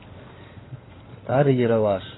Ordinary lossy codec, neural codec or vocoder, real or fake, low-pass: AAC, 16 kbps; codec, 16 kHz in and 24 kHz out, 2.2 kbps, FireRedTTS-2 codec; fake; 7.2 kHz